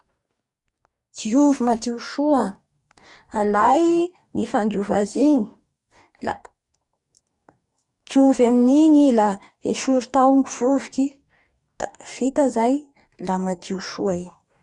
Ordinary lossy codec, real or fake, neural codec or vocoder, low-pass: Opus, 64 kbps; fake; codec, 44.1 kHz, 2.6 kbps, DAC; 10.8 kHz